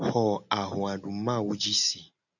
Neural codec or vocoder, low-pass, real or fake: none; 7.2 kHz; real